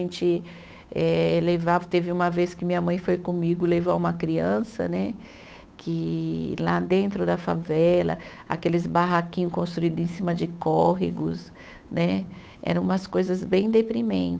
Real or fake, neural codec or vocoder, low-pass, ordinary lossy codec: fake; codec, 16 kHz, 8 kbps, FunCodec, trained on Chinese and English, 25 frames a second; none; none